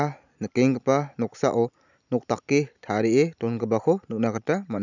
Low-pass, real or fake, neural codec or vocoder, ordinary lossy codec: 7.2 kHz; real; none; none